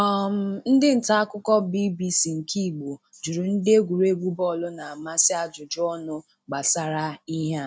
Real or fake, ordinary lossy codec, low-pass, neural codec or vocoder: real; none; none; none